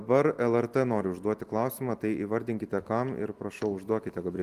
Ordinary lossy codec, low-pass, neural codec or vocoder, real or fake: Opus, 24 kbps; 14.4 kHz; none; real